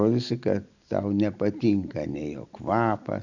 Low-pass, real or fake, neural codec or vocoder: 7.2 kHz; real; none